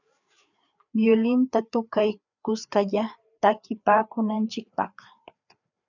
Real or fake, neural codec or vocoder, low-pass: fake; codec, 16 kHz, 4 kbps, FreqCodec, larger model; 7.2 kHz